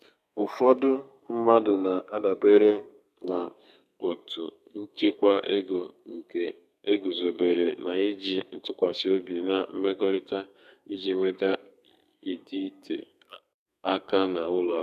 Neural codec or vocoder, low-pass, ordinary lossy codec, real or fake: codec, 32 kHz, 1.9 kbps, SNAC; 14.4 kHz; none; fake